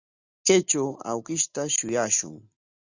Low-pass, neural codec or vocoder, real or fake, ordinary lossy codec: 7.2 kHz; none; real; Opus, 64 kbps